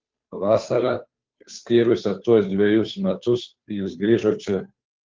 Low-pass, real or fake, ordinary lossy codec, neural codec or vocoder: 7.2 kHz; fake; Opus, 32 kbps; codec, 16 kHz, 2 kbps, FunCodec, trained on Chinese and English, 25 frames a second